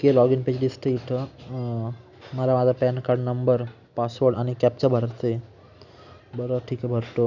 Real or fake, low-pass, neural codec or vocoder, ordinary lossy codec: real; 7.2 kHz; none; none